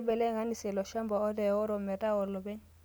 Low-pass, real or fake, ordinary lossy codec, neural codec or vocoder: none; real; none; none